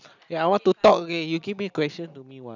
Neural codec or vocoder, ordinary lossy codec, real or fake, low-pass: none; none; real; 7.2 kHz